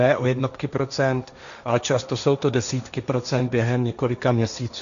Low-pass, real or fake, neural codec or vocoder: 7.2 kHz; fake; codec, 16 kHz, 1.1 kbps, Voila-Tokenizer